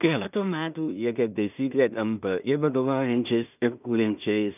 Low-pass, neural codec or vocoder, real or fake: 3.6 kHz; codec, 16 kHz in and 24 kHz out, 0.4 kbps, LongCat-Audio-Codec, two codebook decoder; fake